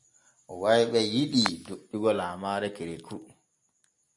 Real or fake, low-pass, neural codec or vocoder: real; 10.8 kHz; none